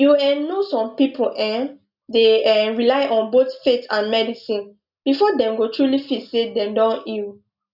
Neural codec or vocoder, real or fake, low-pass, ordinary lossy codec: none; real; 5.4 kHz; none